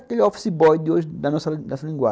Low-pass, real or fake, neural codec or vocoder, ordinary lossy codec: none; real; none; none